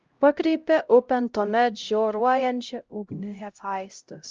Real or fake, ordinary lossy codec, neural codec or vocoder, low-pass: fake; Opus, 24 kbps; codec, 16 kHz, 0.5 kbps, X-Codec, HuBERT features, trained on LibriSpeech; 7.2 kHz